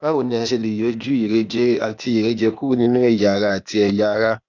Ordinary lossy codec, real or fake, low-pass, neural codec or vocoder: none; fake; 7.2 kHz; codec, 16 kHz, 0.8 kbps, ZipCodec